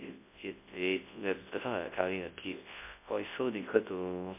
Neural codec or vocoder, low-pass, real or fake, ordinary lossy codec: codec, 24 kHz, 0.9 kbps, WavTokenizer, large speech release; 3.6 kHz; fake; MP3, 24 kbps